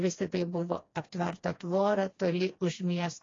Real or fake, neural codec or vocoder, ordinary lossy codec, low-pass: fake; codec, 16 kHz, 2 kbps, FreqCodec, smaller model; AAC, 32 kbps; 7.2 kHz